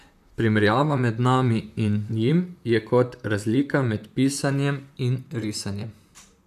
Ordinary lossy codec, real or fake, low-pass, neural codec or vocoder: AAC, 96 kbps; fake; 14.4 kHz; vocoder, 44.1 kHz, 128 mel bands, Pupu-Vocoder